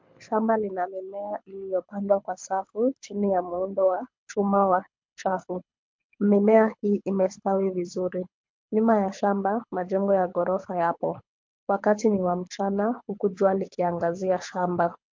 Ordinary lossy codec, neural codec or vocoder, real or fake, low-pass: MP3, 48 kbps; codec, 24 kHz, 6 kbps, HILCodec; fake; 7.2 kHz